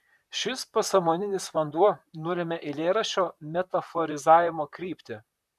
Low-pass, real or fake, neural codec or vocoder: 14.4 kHz; fake; vocoder, 44.1 kHz, 128 mel bands, Pupu-Vocoder